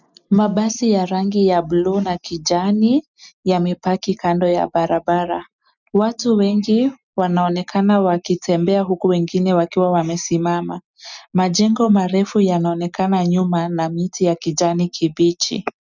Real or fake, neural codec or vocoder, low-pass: real; none; 7.2 kHz